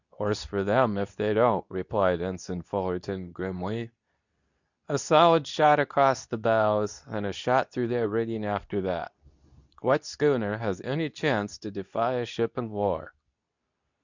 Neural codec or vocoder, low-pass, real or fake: codec, 24 kHz, 0.9 kbps, WavTokenizer, medium speech release version 2; 7.2 kHz; fake